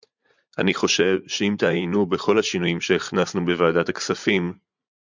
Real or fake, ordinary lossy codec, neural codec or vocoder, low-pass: fake; MP3, 64 kbps; vocoder, 44.1 kHz, 80 mel bands, Vocos; 7.2 kHz